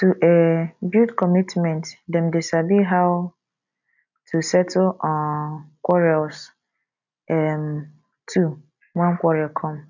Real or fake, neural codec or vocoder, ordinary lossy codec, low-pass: real; none; none; 7.2 kHz